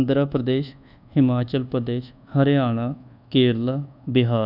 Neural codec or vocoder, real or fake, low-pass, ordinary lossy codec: codec, 24 kHz, 1.2 kbps, DualCodec; fake; 5.4 kHz; none